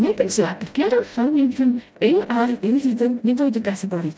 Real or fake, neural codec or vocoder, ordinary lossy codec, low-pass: fake; codec, 16 kHz, 0.5 kbps, FreqCodec, smaller model; none; none